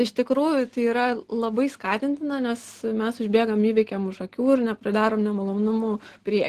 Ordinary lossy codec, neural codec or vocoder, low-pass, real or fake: Opus, 16 kbps; none; 14.4 kHz; real